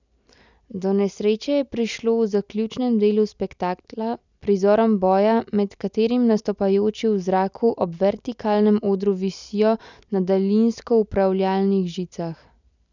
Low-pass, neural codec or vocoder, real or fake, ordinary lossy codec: 7.2 kHz; none; real; none